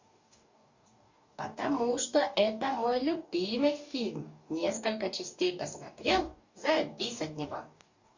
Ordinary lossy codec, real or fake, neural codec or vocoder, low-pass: Opus, 64 kbps; fake; codec, 44.1 kHz, 2.6 kbps, DAC; 7.2 kHz